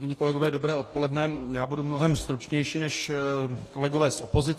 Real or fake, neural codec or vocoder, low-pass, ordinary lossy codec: fake; codec, 44.1 kHz, 2.6 kbps, DAC; 14.4 kHz; AAC, 48 kbps